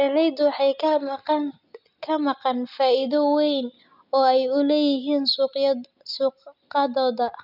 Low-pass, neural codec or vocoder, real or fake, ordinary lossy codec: 5.4 kHz; none; real; none